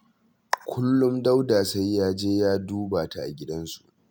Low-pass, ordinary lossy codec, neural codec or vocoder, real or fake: none; none; none; real